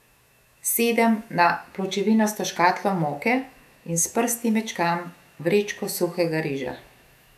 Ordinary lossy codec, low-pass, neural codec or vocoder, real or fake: none; 14.4 kHz; autoencoder, 48 kHz, 128 numbers a frame, DAC-VAE, trained on Japanese speech; fake